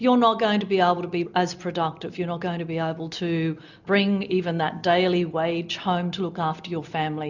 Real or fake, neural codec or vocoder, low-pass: real; none; 7.2 kHz